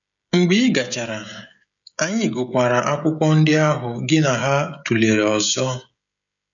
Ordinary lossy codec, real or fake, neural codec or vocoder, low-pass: none; fake; codec, 16 kHz, 16 kbps, FreqCodec, smaller model; 7.2 kHz